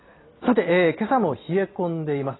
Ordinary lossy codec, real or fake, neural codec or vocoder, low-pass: AAC, 16 kbps; real; none; 7.2 kHz